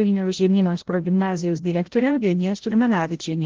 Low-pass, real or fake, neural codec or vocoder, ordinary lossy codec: 7.2 kHz; fake; codec, 16 kHz, 0.5 kbps, FreqCodec, larger model; Opus, 16 kbps